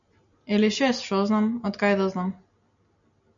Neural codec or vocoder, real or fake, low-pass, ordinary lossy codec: none; real; 7.2 kHz; MP3, 64 kbps